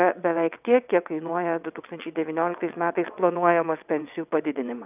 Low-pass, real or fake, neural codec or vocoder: 3.6 kHz; fake; vocoder, 22.05 kHz, 80 mel bands, WaveNeXt